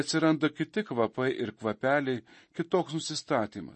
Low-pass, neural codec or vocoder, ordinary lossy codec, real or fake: 10.8 kHz; none; MP3, 32 kbps; real